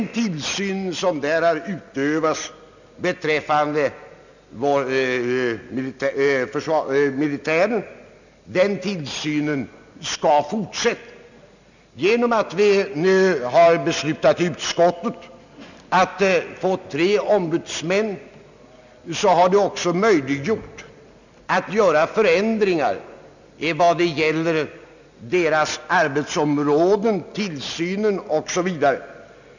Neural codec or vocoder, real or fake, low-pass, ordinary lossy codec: none; real; 7.2 kHz; none